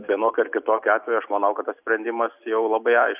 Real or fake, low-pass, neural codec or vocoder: real; 3.6 kHz; none